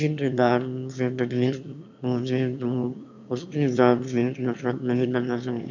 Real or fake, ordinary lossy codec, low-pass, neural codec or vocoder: fake; none; 7.2 kHz; autoencoder, 22.05 kHz, a latent of 192 numbers a frame, VITS, trained on one speaker